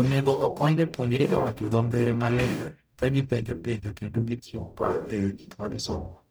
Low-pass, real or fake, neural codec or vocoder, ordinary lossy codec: none; fake; codec, 44.1 kHz, 0.9 kbps, DAC; none